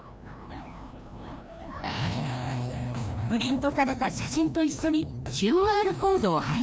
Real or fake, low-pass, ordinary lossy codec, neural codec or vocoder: fake; none; none; codec, 16 kHz, 1 kbps, FreqCodec, larger model